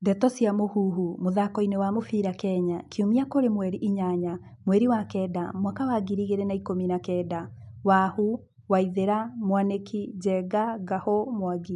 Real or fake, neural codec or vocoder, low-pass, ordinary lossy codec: real; none; 10.8 kHz; none